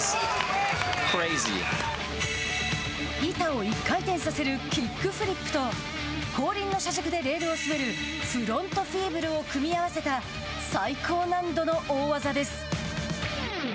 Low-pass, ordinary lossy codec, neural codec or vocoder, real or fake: none; none; none; real